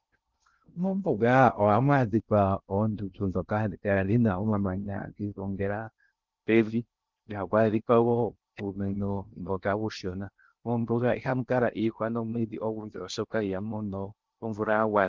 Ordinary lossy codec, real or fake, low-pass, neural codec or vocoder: Opus, 24 kbps; fake; 7.2 kHz; codec, 16 kHz in and 24 kHz out, 0.6 kbps, FocalCodec, streaming, 2048 codes